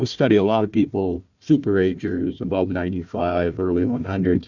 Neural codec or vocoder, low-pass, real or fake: codec, 16 kHz, 1 kbps, FunCodec, trained on Chinese and English, 50 frames a second; 7.2 kHz; fake